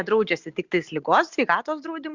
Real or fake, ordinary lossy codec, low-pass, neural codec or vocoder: real; Opus, 64 kbps; 7.2 kHz; none